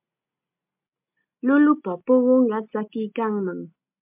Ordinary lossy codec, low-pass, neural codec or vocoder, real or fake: MP3, 32 kbps; 3.6 kHz; none; real